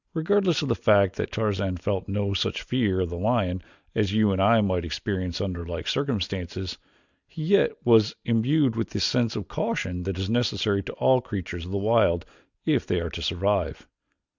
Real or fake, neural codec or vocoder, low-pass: real; none; 7.2 kHz